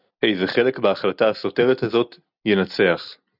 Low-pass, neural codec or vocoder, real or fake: 5.4 kHz; none; real